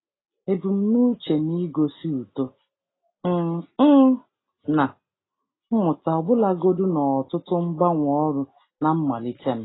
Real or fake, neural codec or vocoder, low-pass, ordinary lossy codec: real; none; 7.2 kHz; AAC, 16 kbps